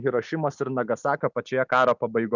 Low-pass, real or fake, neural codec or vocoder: 7.2 kHz; real; none